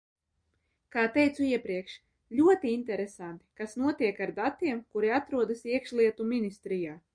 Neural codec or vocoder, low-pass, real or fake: none; 9.9 kHz; real